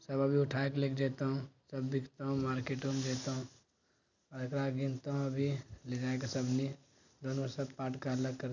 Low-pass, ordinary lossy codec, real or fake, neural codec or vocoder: 7.2 kHz; none; real; none